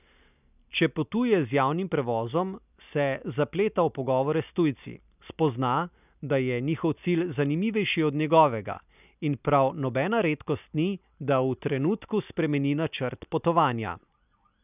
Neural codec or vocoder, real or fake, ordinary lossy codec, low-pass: none; real; none; 3.6 kHz